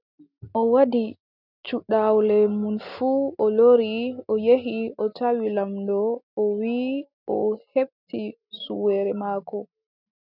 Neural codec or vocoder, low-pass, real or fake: none; 5.4 kHz; real